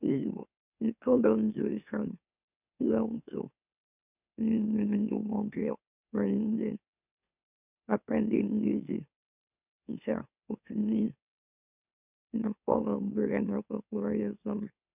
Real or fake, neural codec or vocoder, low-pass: fake; autoencoder, 44.1 kHz, a latent of 192 numbers a frame, MeloTTS; 3.6 kHz